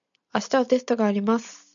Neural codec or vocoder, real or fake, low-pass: none; real; 7.2 kHz